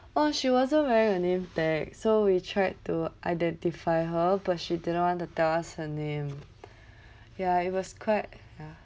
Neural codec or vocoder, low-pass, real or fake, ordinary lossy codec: none; none; real; none